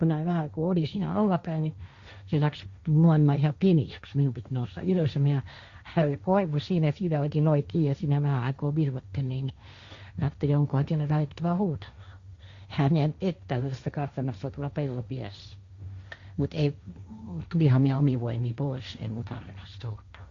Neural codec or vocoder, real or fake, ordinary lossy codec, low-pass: codec, 16 kHz, 1.1 kbps, Voila-Tokenizer; fake; Opus, 64 kbps; 7.2 kHz